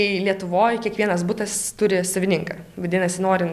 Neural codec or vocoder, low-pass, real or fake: none; 14.4 kHz; real